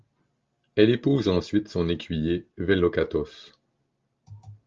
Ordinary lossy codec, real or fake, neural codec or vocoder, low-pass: Opus, 32 kbps; real; none; 7.2 kHz